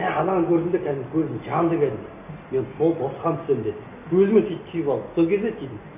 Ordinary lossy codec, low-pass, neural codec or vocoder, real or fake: none; 3.6 kHz; none; real